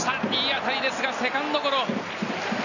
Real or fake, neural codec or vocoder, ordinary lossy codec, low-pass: real; none; none; 7.2 kHz